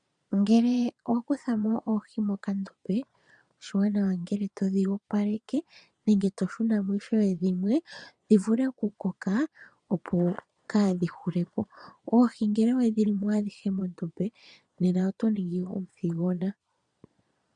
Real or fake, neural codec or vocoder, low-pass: fake; vocoder, 22.05 kHz, 80 mel bands, Vocos; 9.9 kHz